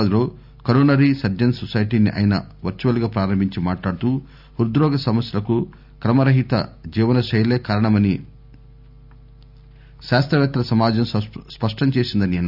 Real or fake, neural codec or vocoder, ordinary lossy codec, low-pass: real; none; none; 5.4 kHz